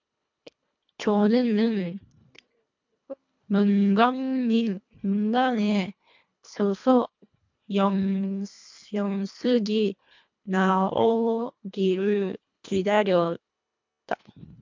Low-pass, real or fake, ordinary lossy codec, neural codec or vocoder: 7.2 kHz; fake; MP3, 64 kbps; codec, 24 kHz, 1.5 kbps, HILCodec